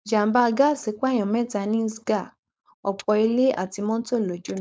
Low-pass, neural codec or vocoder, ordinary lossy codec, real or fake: none; codec, 16 kHz, 4.8 kbps, FACodec; none; fake